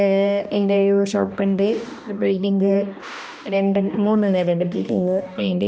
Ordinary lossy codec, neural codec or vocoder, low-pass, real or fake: none; codec, 16 kHz, 1 kbps, X-Codec, HuBERT features, trained on balanced general audio; none; fake